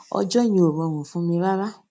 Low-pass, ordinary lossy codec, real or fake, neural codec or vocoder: none; none; real; none